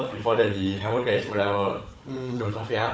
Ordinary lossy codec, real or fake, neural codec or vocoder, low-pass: none; fake; codec, 16 kHz, 4 kbps, FunCodec, trained on Chinese and English, 50 frames a second; none